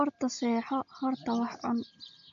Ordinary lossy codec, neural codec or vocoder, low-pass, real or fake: AAC, 48 kbps; none; 7.2 kHz; real